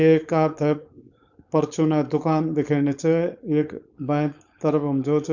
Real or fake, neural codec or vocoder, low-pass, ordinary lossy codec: fake; codec, 16 kHz, 4.8 kbps, FACodec; 7.2 kHz; none